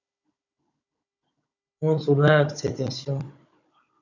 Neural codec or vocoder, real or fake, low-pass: codec, 16 kHz, 16 kbps, FunCodec, trained on Chinese and English, 50 frames a second; fake; 7.2 kHz